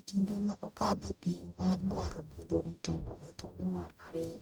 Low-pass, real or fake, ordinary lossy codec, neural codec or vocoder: none; fake; none; codec, 44.1 kHz, 0.9 kbps, DAC